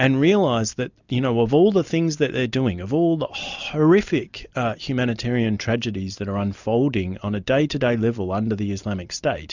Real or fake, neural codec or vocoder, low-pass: real; none; 7.2 kHz